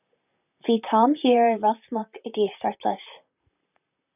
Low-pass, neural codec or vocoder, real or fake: 3.6 kHz; vocoder, 44.1 kHz, 128 mel bands, Pupu-Vocoder; fake